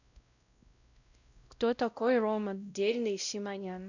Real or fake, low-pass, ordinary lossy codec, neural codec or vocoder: fake; 7.2 kHz; none; codec, 16 kHz, 0.5 kbps, X-Codec, WavLM features, trained on Multilingual LibriSpeech